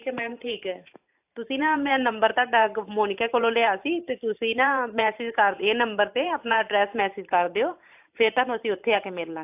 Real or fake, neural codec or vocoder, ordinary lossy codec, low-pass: fake; vocoder, 44.1 kHz, 128 mel bands every 512 samples, BigVGAN v2; AAC, 32 kbps; 3.6 kHz